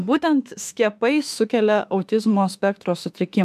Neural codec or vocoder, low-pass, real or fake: autoencoder, 48 kHz, 32 numbers a frame, DAC-VAE, trained on Japanese speech; 14.4 kHz; fake